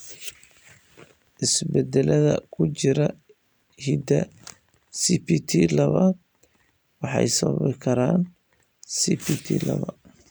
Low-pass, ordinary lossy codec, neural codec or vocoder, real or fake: none; none; none; real